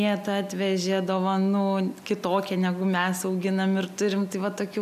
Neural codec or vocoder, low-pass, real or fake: none; 14.4 kHz; real